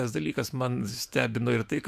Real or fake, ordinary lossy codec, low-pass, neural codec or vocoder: fake; AAC, 96 kbps; 14.4 kHz; autoencoder, 48 kHz, 128 numbers a frame, DAC-VAE, trained on Japanese speech